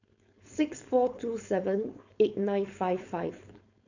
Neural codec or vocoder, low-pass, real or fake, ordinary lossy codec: codec, 16 kHz, 4.8 kbps, FACodec; 7.2 kHz; fake; none